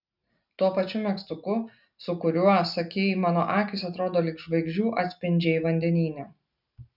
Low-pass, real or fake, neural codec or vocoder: 5.4 kHz; real; none